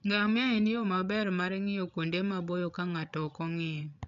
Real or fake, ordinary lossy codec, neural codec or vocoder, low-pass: fake; none; codec, 16 kHz, 16 kbps, FreqCodec, larger model; 7.2 kHz